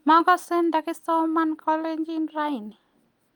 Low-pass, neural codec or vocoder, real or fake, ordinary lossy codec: 19.8 kHz; none; real; Opus, 32 kbps